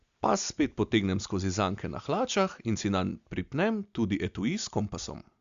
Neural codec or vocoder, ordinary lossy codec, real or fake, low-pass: none; Opus, 64 kbps; real; 7.2 kHz